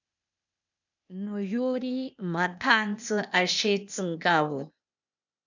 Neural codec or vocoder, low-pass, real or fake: codec, 16 kHz, 0.8 kbps, ZipCodec; 7.2 kHz; fake